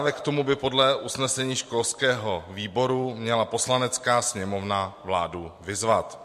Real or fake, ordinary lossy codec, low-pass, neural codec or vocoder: real; MP3, 64 kbps; 14.4 kHz; none